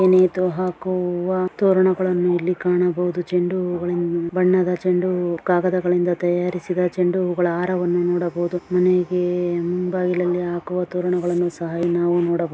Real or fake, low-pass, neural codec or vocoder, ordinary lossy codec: real; none; none; none